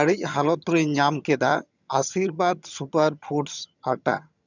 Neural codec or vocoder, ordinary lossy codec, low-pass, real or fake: vocoder, 22.05 kHz, 80 mel bands, HiFi-GAN; none; 7.2 kHz; fake